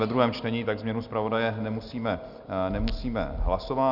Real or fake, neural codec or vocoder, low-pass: real; none; 5.4 kHz